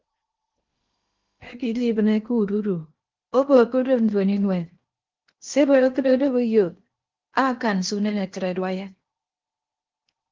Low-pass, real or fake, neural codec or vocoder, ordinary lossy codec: 7.2 kHz; fake; codec, 16 kHz in and 24 kHz out, 0.8 kbps, FocalCodec, streaming, 65536 codes; Opus, 32 kbps